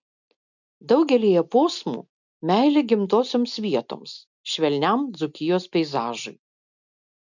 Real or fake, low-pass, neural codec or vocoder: real; 7.2 kHz; none